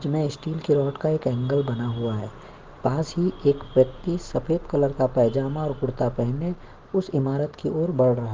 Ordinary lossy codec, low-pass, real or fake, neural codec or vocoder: Opus, 16 kbps; 7.2 kHz; real; none